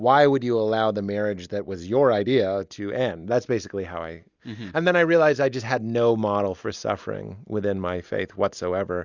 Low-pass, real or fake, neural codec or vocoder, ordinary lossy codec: 7.2 kHz; real; none; Opus, 64 kbps